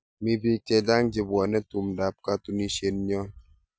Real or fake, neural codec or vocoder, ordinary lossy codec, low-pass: real; none; none; none